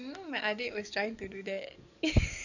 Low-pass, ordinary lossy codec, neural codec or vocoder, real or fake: 7.2 kHz; none; codec, 44.1 kHz, 7.8 kbps, DAC; fake